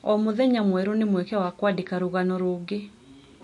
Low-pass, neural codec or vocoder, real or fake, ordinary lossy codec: 10.8 kHz; none; real; MP3, 48 kbps